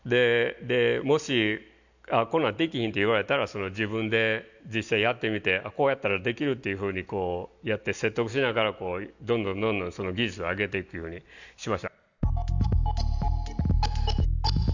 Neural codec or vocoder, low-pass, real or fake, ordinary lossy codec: none; 7.2 kHz; real; none